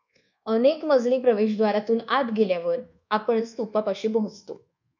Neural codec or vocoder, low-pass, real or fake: codec, 24 kHz, 1.2 kbps, DualCodec; 7.2 kHz; fake